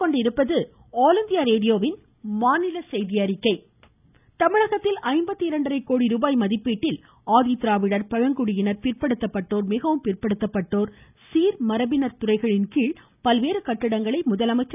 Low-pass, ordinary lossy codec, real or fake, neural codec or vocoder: 3.6 kHz; none; real; none